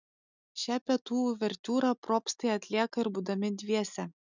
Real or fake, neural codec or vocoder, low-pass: real; none; 7.2 kHz